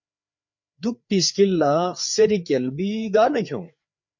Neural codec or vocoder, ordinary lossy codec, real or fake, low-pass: codec, 16 kHz, 4 kbps, FreqCodec, larger model; MP3, 48 kbps; fake; 7.2 kHz